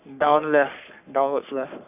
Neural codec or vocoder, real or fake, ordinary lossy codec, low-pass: codec, 44.1 kHz, 3.4 kbps, Pupu-Codec; fake; none; 3.6 kHz